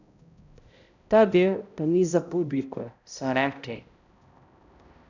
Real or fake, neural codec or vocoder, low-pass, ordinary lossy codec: fake; codec, 16 kHz, 0.5 kbps, X-Codec, HuBERT features, trained on balanced general audio; 7.2 kHz; none